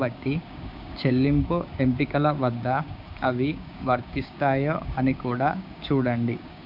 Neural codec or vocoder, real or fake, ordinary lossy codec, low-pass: codec, 16 kHz, 6 kbps, DAC; fake; none; 5.4 kHz